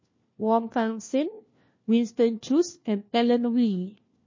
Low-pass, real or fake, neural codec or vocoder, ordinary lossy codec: 7.2 kHz; fake; codec, 16 kHz, 1 kbps, FunCodec, trained on LibriTTS, 50 frames a second; MP3, 32 kbps